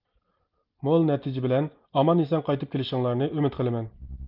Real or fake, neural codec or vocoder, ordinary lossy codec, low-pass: real; none; Opus, 32 kbps; 5.4 kHz